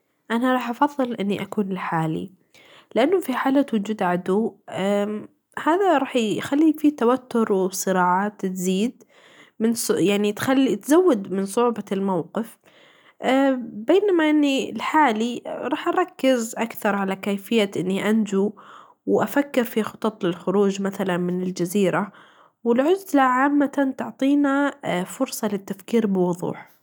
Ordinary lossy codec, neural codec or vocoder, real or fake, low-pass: none; none; real; none